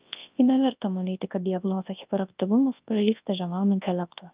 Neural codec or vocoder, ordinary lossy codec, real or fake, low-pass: codec, 24 kHz, 0.9 kbps, WavTokenizer, large speech release; Opus, 24 kbps; fake; 3.6 kHz